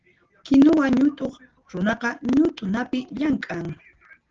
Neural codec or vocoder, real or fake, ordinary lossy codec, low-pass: none; real; Opus, 16 kbps; 7.2 kHz